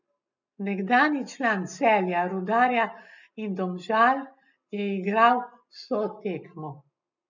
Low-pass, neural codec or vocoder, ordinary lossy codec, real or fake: 7.2 kHz; none; none; real